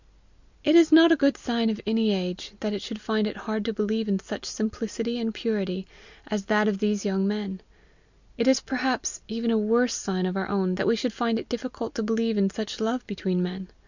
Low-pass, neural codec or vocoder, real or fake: 7.2 kHz; none; real